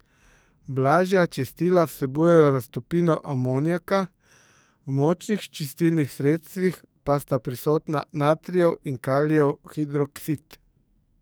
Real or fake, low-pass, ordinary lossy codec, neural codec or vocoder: fake; none; none; codec, 44.1 kHz, 2.6 kbps, SNAC